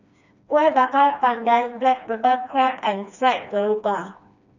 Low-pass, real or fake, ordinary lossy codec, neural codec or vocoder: 7.2 kHz; fake; none; codec, 16 kHz, 2 kbps, FreqCodec, smaller model